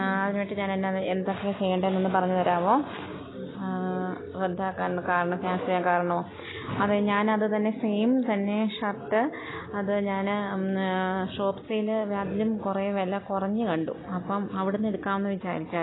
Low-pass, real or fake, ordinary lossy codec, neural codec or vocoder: 7.2 kHz; real; AAC, 16 kbps; none